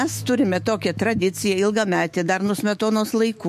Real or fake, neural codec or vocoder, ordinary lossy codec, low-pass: fake; autoencoder, 48 kHz, 128 numbers a frame, DAC-VAE, trained on Japanese speech; MP3, 64 kbps; 14.4 kHz